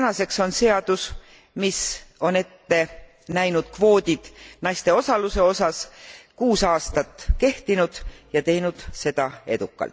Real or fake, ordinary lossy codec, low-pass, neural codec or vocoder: real; none; none; none